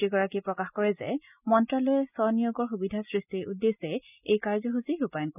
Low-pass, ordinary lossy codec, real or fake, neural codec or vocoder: 3.6 kHz; none; real; none